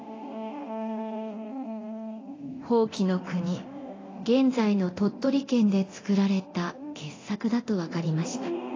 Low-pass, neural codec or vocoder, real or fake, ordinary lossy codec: 7.2 kHz; codec, 24 kHz, 0.9 kbps, DualCodec; fake; AAC, 32 kbps